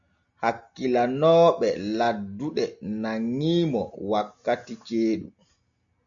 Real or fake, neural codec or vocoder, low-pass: real; none; 7.2 kHz